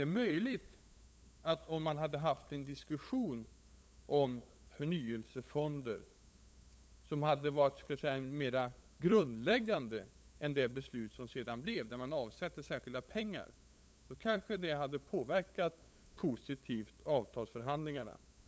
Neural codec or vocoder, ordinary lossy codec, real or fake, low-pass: codec, 16 kHz, 8 kbps, FunCodec, trained on LibriTTS, 25 frames a second; none; fake; none